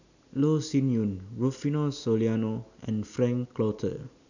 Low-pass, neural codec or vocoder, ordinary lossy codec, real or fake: 7.2 kHz; none; none; real